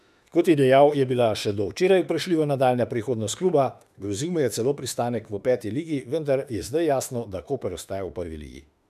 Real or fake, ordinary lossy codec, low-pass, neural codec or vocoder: fake; none; 14.4 kHz; autoencoder, 48 kHz, 32 numbers a frame, DAC-VAE, trained on Japanese speech